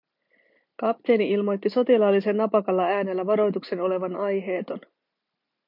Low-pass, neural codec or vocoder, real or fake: 5.4 kHz; none; real